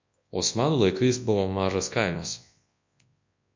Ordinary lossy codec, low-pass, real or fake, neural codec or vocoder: MP3, 48 kbps; 7.2 kHz; fake; codec, 24 kHz, 0.9 kbps, WavTokenizer, large speech release